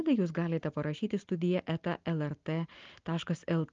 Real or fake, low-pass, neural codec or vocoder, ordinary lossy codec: real; 7.2 kHz; none; Opus, 24 kbps